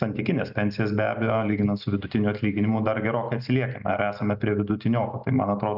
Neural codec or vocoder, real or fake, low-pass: none; real; 5.4 kHz